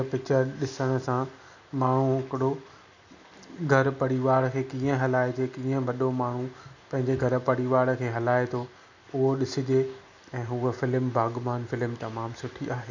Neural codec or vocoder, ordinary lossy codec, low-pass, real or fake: none; none; 7.2 kHz; real